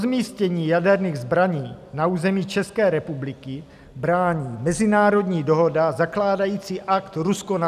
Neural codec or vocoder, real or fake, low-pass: none; real; 14.4 kHz